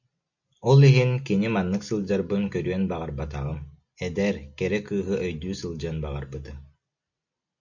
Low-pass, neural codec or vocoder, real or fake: 7.2 kHz; none; real